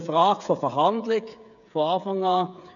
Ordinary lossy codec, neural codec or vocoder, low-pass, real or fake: none; codec, 16 kHz, 8 kbps, FreqCodec, smaller model; 7.2 kHz; fake